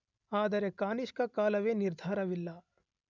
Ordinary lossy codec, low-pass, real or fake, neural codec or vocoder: none; 7.2 kHz; real; none